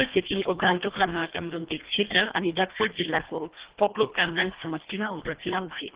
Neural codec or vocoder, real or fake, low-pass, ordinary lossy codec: codec, 24 kHz, 1.5 kbps, HILCodec; fake; 3.6 kHz; Opus, 16 kbps